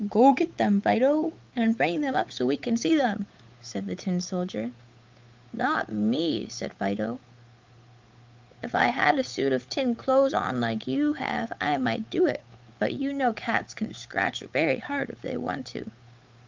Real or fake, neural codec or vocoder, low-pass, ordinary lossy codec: fake; codec, 16 kHz, 8 kbps, FunCodec, trained on Chinese and English, 25 frames a second; 7.2 kHz; Opus, 32 kbps